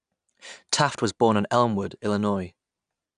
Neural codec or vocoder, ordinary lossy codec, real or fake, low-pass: none; none; real; 9.9 kHz